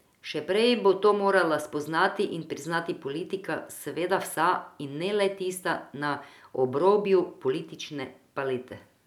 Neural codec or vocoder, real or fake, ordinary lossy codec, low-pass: none; real; none; 19.8 kHz